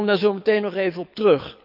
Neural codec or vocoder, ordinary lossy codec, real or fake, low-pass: codec, 24 kHz, 6 kbps, HILCodec; none; fake; 5.4 kHz